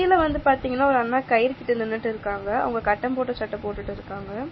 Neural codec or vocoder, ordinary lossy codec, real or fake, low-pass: none; MP3, 24 kbps; real; 7.2 kHz